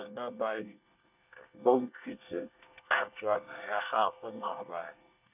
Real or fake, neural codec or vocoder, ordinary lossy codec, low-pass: fake; codec, 24 kHz, 1 kbps, SNAC; none; 3.6 kHz